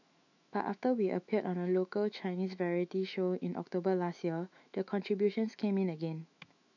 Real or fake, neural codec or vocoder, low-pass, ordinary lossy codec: fake; autoencoder, 48 kHz, 128 numbers a frame, DAC-VAE, trained on Japanese speech; 7.2 kHz; none